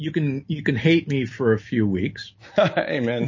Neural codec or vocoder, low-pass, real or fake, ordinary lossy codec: none; 7.2 kHz; real; MP3, 32 kbps